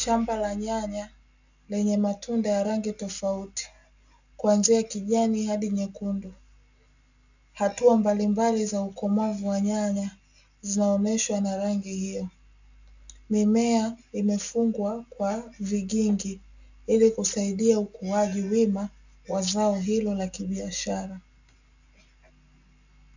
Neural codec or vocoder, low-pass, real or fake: none; 7.2 kHz; real